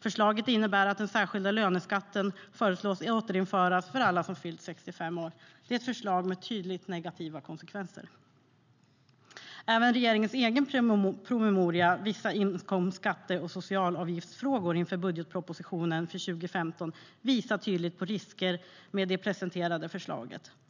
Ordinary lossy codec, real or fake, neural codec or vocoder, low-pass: none; real; none; 7.2 kHz